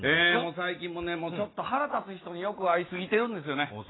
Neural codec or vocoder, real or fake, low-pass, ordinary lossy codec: none; real; 7.2 kHz; AAC, 16 kbps